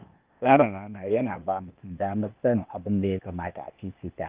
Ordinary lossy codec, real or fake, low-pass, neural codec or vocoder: Opus, 24 kbps; fake; 3.6 kHz; codec, 16 kHz, 0.8 kbps, ZipCodec